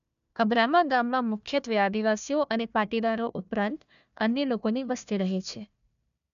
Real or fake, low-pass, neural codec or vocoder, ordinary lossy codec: fake; 7.2 kHz; codec, 16 kHz, 1 kbps, FunCodec, trained on Chinese and English, 50 frames a second; none